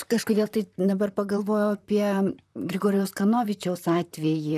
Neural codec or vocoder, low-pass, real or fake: vocoder, 44.1 kHz, 128 mel bands, Pupu-Vocoder; 14.4 kHz; fake